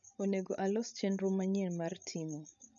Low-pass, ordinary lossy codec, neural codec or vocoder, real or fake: 7.2 kHz; none; codec, 16 kHz, 16 kbps, FreqCodec, larger model; fake